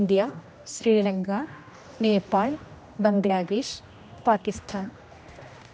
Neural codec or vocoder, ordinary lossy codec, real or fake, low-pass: codec, 16 kHz, 1 kbps, X-Codec, HuBERT features, trained on general audio; none; fake; none